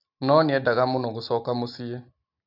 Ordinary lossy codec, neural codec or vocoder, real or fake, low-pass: none; none; real; 5.4 kHz